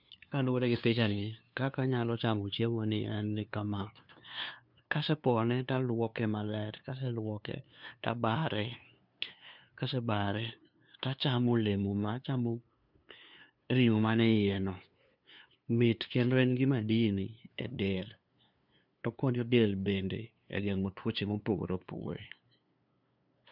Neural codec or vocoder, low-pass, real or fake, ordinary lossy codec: codec, 16 kHz, 2 kbps, FunCodec, trained on LibriTTS, 25 frames a second; 5.4 kHz; fake; none